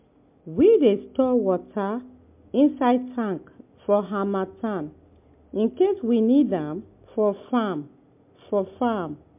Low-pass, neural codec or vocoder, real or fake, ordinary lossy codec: 3.6 kHz; none; real; MP3, 32 kbps